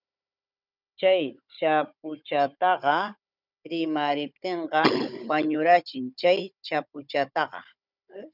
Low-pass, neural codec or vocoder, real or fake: 5.4 kHz; codec, 16 kHz, 16 kbps, FunCodec, trained on Chinese and English, 50 frames a second; fake